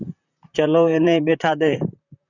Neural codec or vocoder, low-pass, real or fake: vocoder, 44.1 kHz, 128 mel bands, Pupu-Vocoder; 7.2 kHz; fake